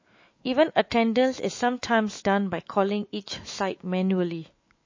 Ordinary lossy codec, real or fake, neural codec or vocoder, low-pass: MP3, 32 kbps; fake; autoencoder, 48 kHz, 128 numbers a frame, DAC-VAE, trained on Japanese speech; 7.2 kHz